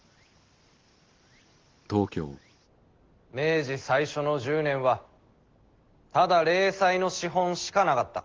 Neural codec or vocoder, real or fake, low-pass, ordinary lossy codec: none; real; 7.2 kHz; Opus, 16 kbps